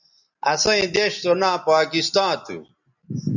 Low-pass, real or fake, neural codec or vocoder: 7.2 kHz; real; none